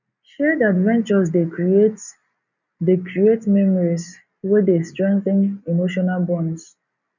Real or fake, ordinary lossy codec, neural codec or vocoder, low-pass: real; none; none; 7.2 kHz